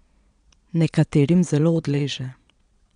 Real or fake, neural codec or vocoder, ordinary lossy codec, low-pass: fake; vocoder, 22.05 kHz, 80 mel bands, Vocos; none; 9.9 kHz